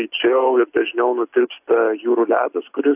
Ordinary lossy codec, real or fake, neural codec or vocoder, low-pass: AAC, 32 kbps; real; none; 3.6 kHz